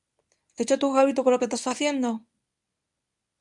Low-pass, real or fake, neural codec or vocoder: 10.8 kHz; fake; codec, 24 kHz, 0.9 kbps, WavTokenizer, medium speech release version 1